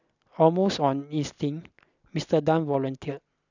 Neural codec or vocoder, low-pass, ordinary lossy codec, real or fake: none; 7.2 kHz; none; real